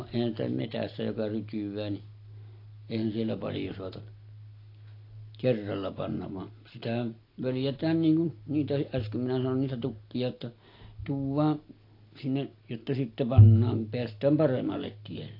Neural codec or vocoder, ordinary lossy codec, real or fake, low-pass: none; AAC, 32 kbps; real; 5.4 kHz